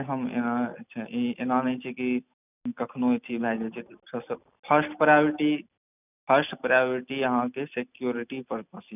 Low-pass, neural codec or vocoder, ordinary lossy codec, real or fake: 3.6 kHz; none; none; real